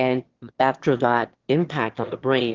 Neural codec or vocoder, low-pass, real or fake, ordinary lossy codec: autoencoder, 22.05 kHz, a latent of 192 numbers a frame, VITS, trained on one speaker; 7.2 kHz; fake; Opus, 16 kbps